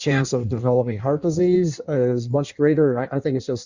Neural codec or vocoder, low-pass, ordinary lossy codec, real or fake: codec, 16 kHz in and 24 kHz out, 1.1 kbps, FireRedTTS-2 codec; 7.2 kHz; Opus, 64 kbps; fake